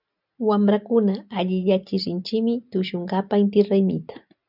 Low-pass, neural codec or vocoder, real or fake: 5.4 kHz; none; real